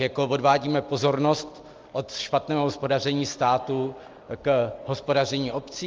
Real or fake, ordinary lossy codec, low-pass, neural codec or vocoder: real; Opus, 24 kbps; 7.2 kHz; none